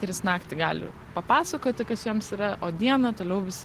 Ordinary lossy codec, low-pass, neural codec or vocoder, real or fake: Opus, 16 kbps; 14.4 kHz; none; real